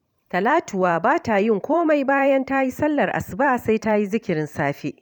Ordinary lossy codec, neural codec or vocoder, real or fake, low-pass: none; vocoder, 44.1 kHz, 128 mel bands every 512 samples, BigVGAN v2; fake; 19.8 kHz